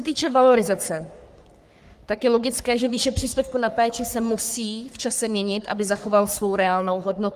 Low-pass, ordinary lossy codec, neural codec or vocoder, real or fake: 14.4 kHz; Opus, 32 kbps; codec, 44.1 kHz, 3.4 kbps, Pupu-Codec; fake